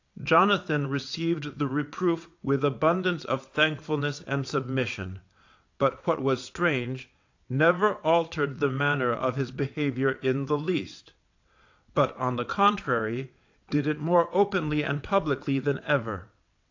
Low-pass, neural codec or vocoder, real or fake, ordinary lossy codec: 7.2 kHz; vocoder, 22.05 kHz, 80 mel bands, WaveNeXt; fake; AAC, 48 kbps